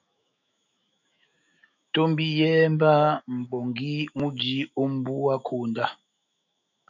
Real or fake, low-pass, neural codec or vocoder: fake; 7.2 kHz; autoencoder, 48 kHz, 128 numbers a frame, DAC-VAE, trained on Japanese speech